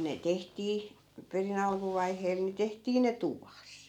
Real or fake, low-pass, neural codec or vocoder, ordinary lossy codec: real; 19.8 kHz; none; none